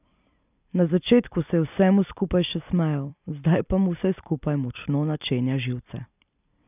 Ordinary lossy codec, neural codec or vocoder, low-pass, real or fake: none; vocoder, 44.1 kHz, 128 mel bands every 256 samples, BigVGAN v2; 3.6 kHz; fake